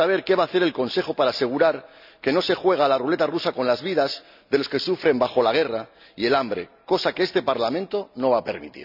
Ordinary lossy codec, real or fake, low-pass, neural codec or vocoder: none; real; 5.4 kHz; none